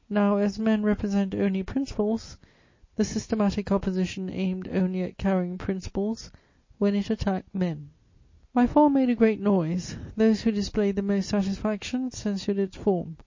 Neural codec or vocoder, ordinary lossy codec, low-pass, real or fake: none; MP3, 32 kbps; 7.2 kHz; real